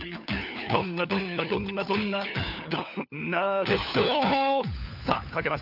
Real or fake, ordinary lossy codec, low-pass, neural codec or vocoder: fake; none; 5.4 kHz; codec, 16 kHz, 4 kbps, FunCodec, trained on LibriTTS, 50 frames a second